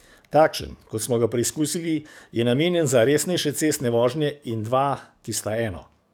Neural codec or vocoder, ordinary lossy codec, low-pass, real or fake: codec, 44.1 kHz, 7.8 kbps, DAC; none; none; fake